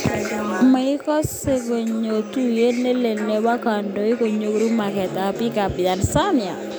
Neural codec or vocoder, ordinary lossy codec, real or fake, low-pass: none; none; real; none